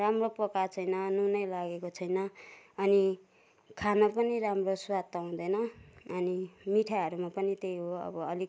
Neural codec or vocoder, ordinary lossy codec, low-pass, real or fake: none; none; none; real